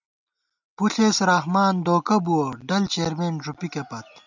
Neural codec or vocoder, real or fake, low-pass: none; real; 7.2 kHz